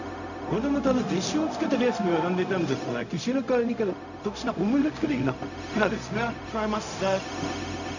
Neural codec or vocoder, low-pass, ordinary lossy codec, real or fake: codec, 16 kHz, 0.4 kbps, LongCat-Audio-Codec; 7.2 kHz; none; fake